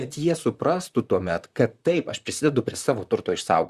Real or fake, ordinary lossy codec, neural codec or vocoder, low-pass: fake; Opus, 64 kbps; vocoder, 44.1 kHz, 128 mel bands, Pupu-Vocoder; 14.4 kHz